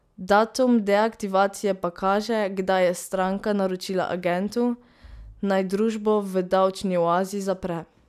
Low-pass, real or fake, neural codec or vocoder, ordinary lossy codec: 14.4 kHz; real; none; none